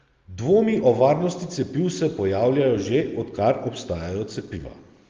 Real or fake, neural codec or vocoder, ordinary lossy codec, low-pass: real; none; Opus, 32 kbps; 7.2 kHz